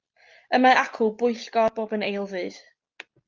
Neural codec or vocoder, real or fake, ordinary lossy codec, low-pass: none; real; Opus, 32 kbps; 7.2 kHz